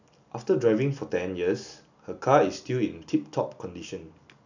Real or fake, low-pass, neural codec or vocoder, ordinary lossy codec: real; 7.2 kHz; none; none